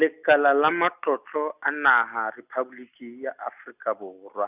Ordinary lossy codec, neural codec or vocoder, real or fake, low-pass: none; none; real; 3.6 kHz